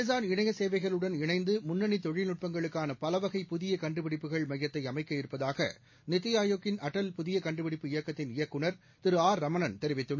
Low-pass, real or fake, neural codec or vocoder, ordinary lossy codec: 7.2 kHz; real; none; MP3, 32 kbps